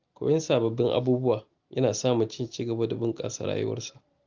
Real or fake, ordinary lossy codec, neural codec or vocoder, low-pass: real; Opus, 32 kbps; none; 7.2 kHz